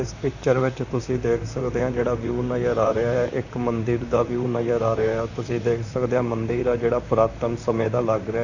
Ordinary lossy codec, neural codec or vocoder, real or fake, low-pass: none; vocoder, 44.1 kHz, 128 mel bands, Pupu-Vocoder; fake; 7.2 kHz